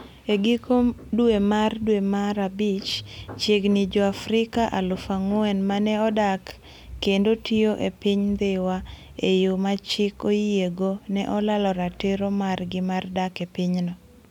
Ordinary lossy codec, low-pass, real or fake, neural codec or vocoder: none; 19.8 kHz; real; none